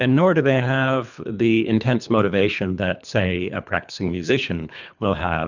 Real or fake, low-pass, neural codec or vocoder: fake; 7.2 kHz; codec, 24 kHz, 3 kbps, HILCodec